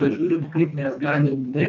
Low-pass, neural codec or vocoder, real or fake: 7.2 kHz; codec, 24 kHz, 1.5 kbps, HILCodec; fake